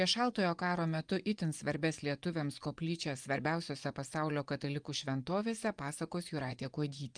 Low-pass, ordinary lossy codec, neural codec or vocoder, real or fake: 9.9 kHz; Opus, 32 kbps; vocoder, 24 kHz, 100 mel bands, Vocos; fake